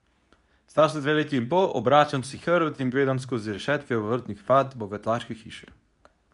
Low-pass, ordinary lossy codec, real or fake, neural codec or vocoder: 10.8 kHz; none; fake; codec, 24 kHz, 0.9 kbps, WavTokenizer, medium speech release version 2